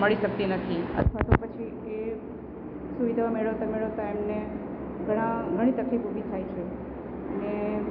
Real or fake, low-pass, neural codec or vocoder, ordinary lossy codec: real; 5.4 kHz; none; none